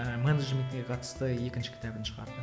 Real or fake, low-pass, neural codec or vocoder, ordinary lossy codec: real; none; none; none